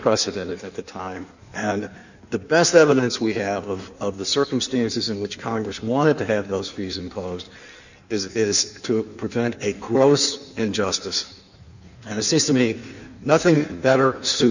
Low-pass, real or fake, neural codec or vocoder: 7.2 kHz; fake; codec, 16 kHz in and 24 kHz out, 1.1 kbps, FireRedTTS-2 codec